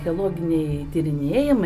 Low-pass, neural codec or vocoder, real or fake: 14.4 kHz; none; real